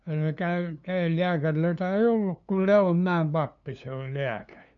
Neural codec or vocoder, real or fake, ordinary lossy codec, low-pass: codec, 16 kHz, 2 kbps, FunCodec, trained on LibriTTS, 25 frames a second; fake; none; 7.2 kHz